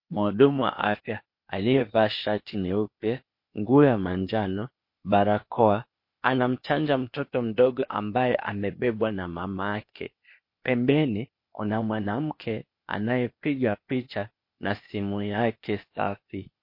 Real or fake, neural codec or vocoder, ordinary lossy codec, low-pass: fake; codec, 16 kHz, 0.8 kbps, ZipCodec; MP3, 32 kbps; 5.4 kHz